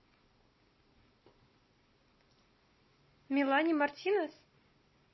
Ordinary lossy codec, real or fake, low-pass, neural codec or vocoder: MP3, 24 kbps; real; 7.2 kHz; none